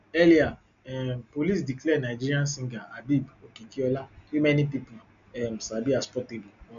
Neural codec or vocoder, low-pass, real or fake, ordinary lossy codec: none; 7.2 kHz; real; none